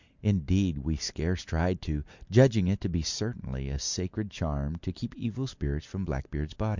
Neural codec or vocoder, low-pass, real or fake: none; 7.2 kHz; real